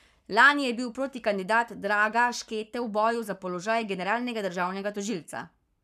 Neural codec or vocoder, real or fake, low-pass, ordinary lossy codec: codec, 44.1 kHz, 7.8 kbps, Pupu-Codec; fake; 14.4 kHz; none